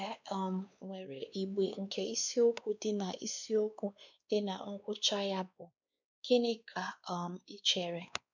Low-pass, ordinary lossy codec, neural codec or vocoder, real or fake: 7.2 kHz; none; codec, 16 kHz, 2 kbps, X-Codec, WavLM features, trained on Multilingual LibriSpeech; fake